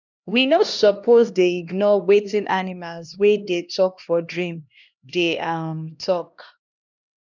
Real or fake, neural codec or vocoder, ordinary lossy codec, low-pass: fake; codec, 16 kHz, 1 kbps, X-Codec, HuBERT features, trained on LibriSpeech; none; 7.2 kHz